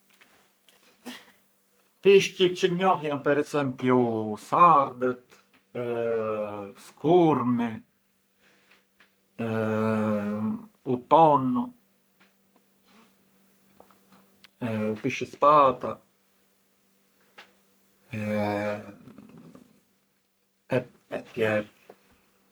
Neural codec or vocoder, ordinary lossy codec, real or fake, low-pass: codec, 44.1 kHz, 3.4 kbps, Pupu-Codec; none; fake; none